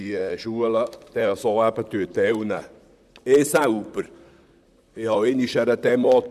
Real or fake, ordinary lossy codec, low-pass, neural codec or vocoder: fake; none; 14.4 kHz; vocoder, 44.1 kHz, 128 mel bands, Pupu-Vocoder